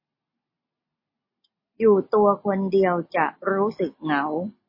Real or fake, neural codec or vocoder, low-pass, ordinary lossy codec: real; none; 5.4 kHz; MP3, 24 kbps